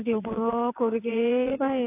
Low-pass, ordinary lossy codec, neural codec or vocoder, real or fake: 3.6 kHz; AAC, 24 kbps; vocoder, 22.05 kHz, 80 mel bands, WaveNeXt; fake